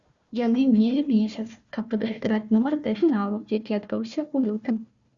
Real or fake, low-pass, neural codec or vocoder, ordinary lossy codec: fake; 7.2 kHz; codec, 16 kHz, 1 kbps, FunCodec, trained on Chinese and English, 50 frames a second; Opus, 64 kbps